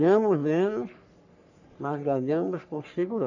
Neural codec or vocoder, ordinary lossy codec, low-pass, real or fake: codec, 44.1 kHz, 3.4 kbps, Pupu-Codec; none; 7.2 kHz; fake